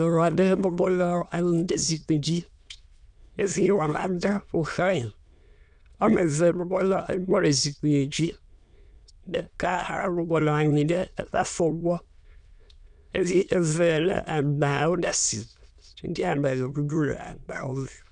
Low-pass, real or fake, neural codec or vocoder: 9.9 kHz; fake; autoencoder, 22.05 kHz, a latent of 192 numbers a frame, VITS, trained on many speakers